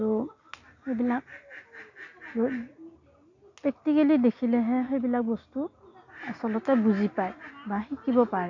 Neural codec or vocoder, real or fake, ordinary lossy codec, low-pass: none; real; none; 7.2 kHz